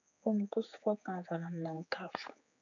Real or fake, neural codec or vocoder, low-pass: fake; codec, 16 kHz, 4 kbps, X-Codec, HuBERT features, trained on general audio; 7.2 kHz